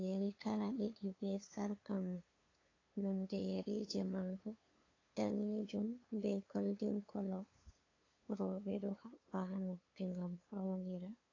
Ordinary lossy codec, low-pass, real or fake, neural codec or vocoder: AAC, 32 kbps; 7.2 kHz; fake; codec, 16 kHz, 2 kbps, FunCodec, trained on LibriTTS, 25 frames a second